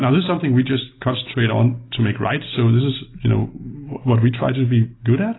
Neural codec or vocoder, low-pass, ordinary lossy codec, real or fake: none; 7.2 kHz; AAC, 16 kbps; real